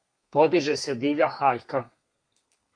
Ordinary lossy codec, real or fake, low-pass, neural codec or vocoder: MP3, 64 kbps; fake; 9.9 kHz; codec, 32 kHz, 1.9 kbps, SNAC